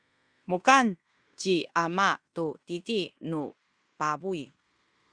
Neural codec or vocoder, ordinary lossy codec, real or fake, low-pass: codec, 16 kHz in and 24 kHz out, 0.9 kbps, LongCat-Audio-Codec, fine tuned four codebook decoder; Opus, 64 kbps; fake; 9.9 kHz